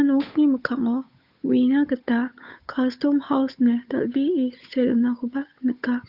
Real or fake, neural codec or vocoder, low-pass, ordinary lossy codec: fake; codec, 16 kHz, 8 kbps, FunCodec, trained on Chinese and English, 25 frames a second; 5.4 kHz; Opus, 64 kbps